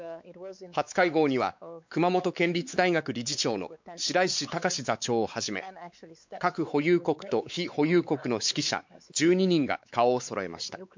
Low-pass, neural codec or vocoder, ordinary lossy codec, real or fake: 7.2 kHz; codec, 16 kHz, 4 kbps, X-Codec, WavLM features, trained on Multilingual LibriSpeech; MP3, 64 kbps; fake